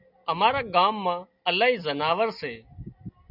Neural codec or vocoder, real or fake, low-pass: none; real; 5.4 kHz